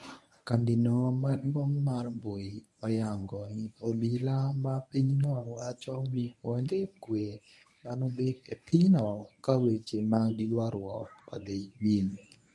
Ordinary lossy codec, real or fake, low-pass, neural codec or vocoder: none; fake; none; codec, 24 kHz, 0.9 kbps, WavTokenizer, medium speech release version 1